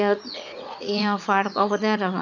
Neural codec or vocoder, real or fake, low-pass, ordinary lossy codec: vocoder, 44.1 kHz, 80 mel bands, Vocos; fake; 7.2 kHz; none